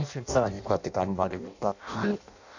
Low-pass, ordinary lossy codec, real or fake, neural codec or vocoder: 7.2 kHz; none; fake; codec, 16 kHz in and 24 kHz out, 0.6 kbps, FireRedTTS-2 codec